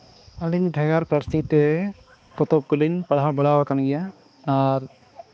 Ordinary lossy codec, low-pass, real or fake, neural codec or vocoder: none; none; fake; codec, 16 kHz, 2 kbps, X-Codec, HuBERT features, trained on balanced general audio